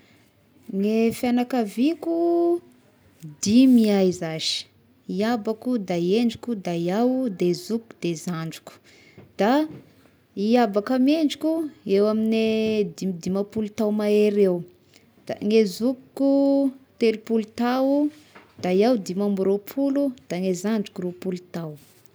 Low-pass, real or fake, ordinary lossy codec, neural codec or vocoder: none; real; none; none